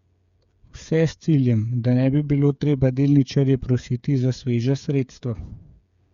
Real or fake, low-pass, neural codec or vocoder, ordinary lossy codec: fake; 7.2 kHz; codec, 16 kHz, 8 kbps, FreqCodec, smaller model; Opus, 64 kbps